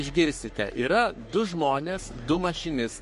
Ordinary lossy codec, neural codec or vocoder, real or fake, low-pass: MP3, 48 kbps; codec, 44.1 kHz, 3.4 kbps, Pupu-Codec; fake; 14.4 kHz